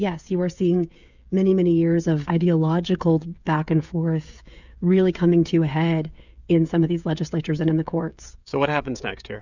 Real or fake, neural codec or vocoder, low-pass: fake; codec, 16 kHz, 16 kbps, FreqCodec, smaller model; 7.2 kHz